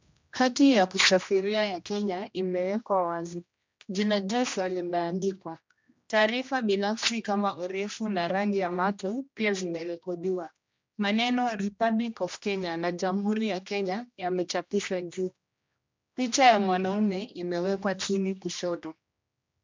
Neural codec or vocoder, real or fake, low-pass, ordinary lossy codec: codec, 16 kHz, 1 kbps, X-Codec, HuBERT features, trained on general audio; fake; 7.2 kHz; MP3, 64 kbps